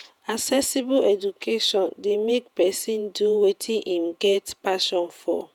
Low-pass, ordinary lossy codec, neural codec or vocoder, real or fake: 19.8 kHz; none; vocoder, 48 kHz, 128 mel bands, Vocos; fake